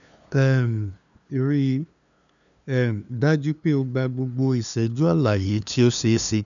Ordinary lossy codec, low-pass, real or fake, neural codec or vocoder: none; 7.2 kHz; fake; codec, 16 kHz, 2 kbps, FunCodec, trained on LibriTTS, 25 frames a second